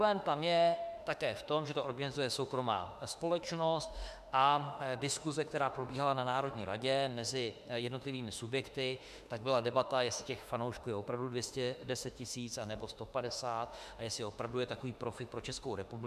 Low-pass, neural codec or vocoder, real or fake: 14.4 kHz; autoencoder, 48 kHz, 32 numbers a frame, DAC-VAE, trained on Japanese speech; fake